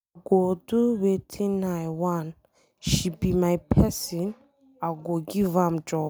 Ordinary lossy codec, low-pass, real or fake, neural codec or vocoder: none; none; real; none